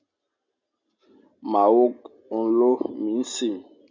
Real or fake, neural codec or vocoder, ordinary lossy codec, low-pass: real; none; MP3, 64 kbps; 7.2 kHz